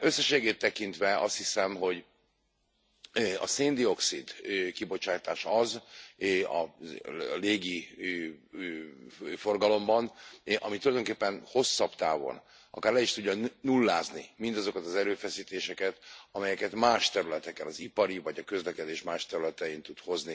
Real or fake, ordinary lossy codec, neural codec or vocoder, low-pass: real; none; none; none